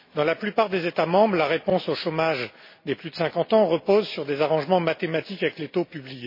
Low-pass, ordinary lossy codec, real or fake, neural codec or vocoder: 5.4 kHz; MP3, 24 kbps; real; none